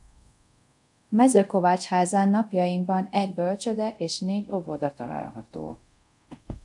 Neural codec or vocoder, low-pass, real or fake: codec, 24 kHz, 0.5 kbps, DualCodec; 10.8 kHz; fake